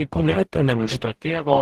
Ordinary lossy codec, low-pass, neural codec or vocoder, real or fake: Opus, 16 kbps; 14.4 kHz; codec, 44.1 kHz, 0.9 kbps, DAC; fake